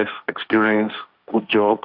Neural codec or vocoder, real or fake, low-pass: codec, 16 kHz, 1.1 kbps, Voila-Tokenizer; fake; 5.4 kHz